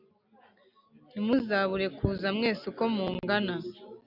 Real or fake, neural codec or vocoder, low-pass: real; none; 5.4 kHz